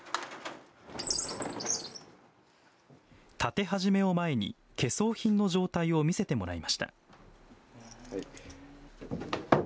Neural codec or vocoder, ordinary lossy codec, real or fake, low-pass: none; none; real; none